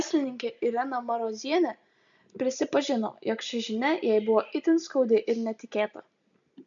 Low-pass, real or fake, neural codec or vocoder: 7.2 kHz; real; none